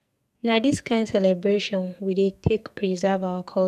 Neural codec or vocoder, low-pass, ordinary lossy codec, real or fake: codec, 44.1 kHz, 2.6 kbps, SNAC; 14.4 kHz; none; fake